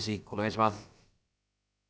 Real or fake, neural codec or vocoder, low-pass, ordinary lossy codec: fake; codec, 16 kHz, about 1 kbps, DyCAST, with the encoder's durations; none; none